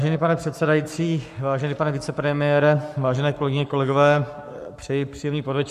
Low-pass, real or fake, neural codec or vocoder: 14.4 kHz; fake; codec, 44.1 kHz, 7.8 kbps, Pupu-Codec